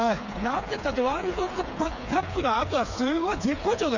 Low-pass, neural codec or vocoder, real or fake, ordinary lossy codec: 7.2 kHz; codec, 16 kHz, 4 kbps, FreqCodec, smaller model; fake; none